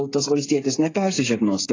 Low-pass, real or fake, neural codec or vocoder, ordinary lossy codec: 7.2 kHz; fake; codec, 16 kHz, 8 kbps, FreqCodec, smaller model; AAC, 32 kbps